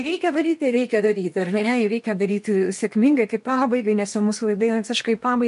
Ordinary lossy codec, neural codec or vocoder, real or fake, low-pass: MP3, 64 kbps; codec, 16 kHz in and 24 kHz out, 0.8 kbps, FocalCodec, streaming, 65536 codes; fake; 10.8 kHz